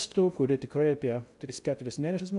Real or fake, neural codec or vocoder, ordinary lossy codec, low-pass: fake; codec, 16 kHz in and 24 kHz out, 0.6 kbps, FocalCodec, streaming, 2048 codes; AAC, 96 kbps; 10.8 kHz